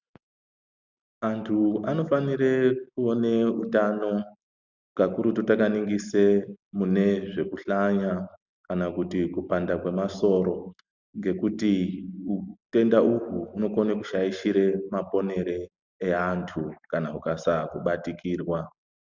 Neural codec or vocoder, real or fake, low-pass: none; real; 7.2 kHz